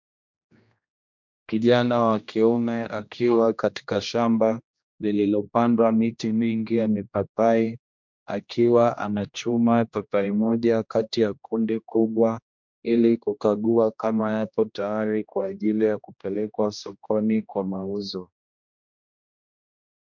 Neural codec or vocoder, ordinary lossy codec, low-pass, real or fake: codec, 16 kHz, 1 kbps, X-Codec, HuBERT features, trained on general audio; MP3, 64 kbps; 7.2 kHz; fake